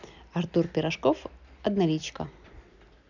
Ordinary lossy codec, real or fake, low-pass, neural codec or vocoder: none; real; 7.2 kHz; none